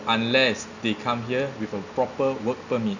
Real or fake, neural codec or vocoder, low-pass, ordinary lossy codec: real; none; 7.2 kHz; none